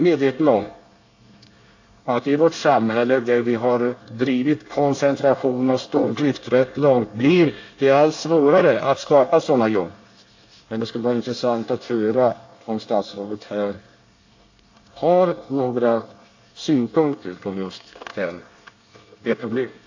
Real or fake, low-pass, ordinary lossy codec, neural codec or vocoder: fake; 7.2 kHz; AAC, 48 kbps; codec, 24 kHz, 1 kbps, SNAC